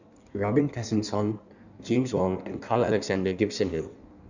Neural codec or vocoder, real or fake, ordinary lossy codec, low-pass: codec, 16 kHz in and 24 kHz out, 1.1 kbps, FireRedTTS-2 codec; fake; none; 7.2 kHz